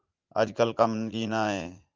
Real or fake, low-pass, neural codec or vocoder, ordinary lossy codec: fake; 7.2 kHz; vocoder, 44.1 kHz, 80 mel bands, Vocos; Opus, 24 kbps